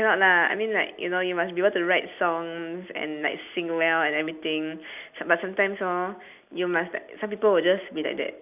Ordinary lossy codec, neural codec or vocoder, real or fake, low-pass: none; none; real; 3.6 kHz